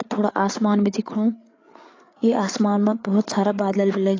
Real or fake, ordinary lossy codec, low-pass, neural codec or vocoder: fake; AAC, 32 kbps; 7.2 kHz; codec, 16 kHz, 8 kbps, FreqCodec, larger model